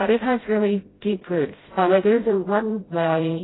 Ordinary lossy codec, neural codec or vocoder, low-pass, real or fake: AAC, 16 kbps; codec, 16 kHz, 0.5 kbps, FreqCodec, smaller model; 7.2 kHz; fake